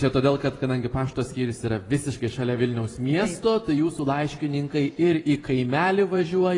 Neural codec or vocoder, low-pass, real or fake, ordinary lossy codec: vocoder, 44.1 kHz, 128 mel bands every 512 samples, BigVGAN v2; 10.8 kHz; fake; AAC, 32 kbps